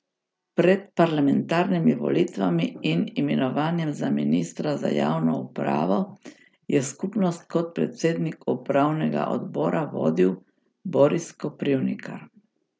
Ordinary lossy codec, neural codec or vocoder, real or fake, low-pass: none; none; real; none